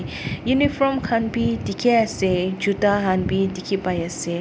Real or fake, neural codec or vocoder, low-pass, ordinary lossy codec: real; none; none; none